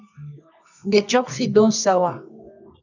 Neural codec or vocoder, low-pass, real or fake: codec, 24 kHz, 0.9 kbps, WavTokenizer, medium music audio release; 7.2 kHz; fake